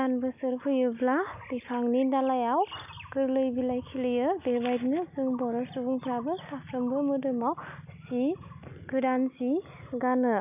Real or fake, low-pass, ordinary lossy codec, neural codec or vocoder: real; 3.6 kHz; none; none